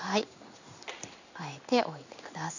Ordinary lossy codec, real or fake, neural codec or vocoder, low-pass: none; fake; codec, 16 kHz in and 24 kHz out, 1 kbps, XY-Tokenizer; 7.2 kHz